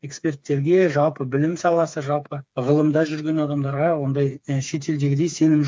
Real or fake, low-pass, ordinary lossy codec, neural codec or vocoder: fake; none; none; codec, 16 kHz, 4 kbps, FreqCodec, smaller model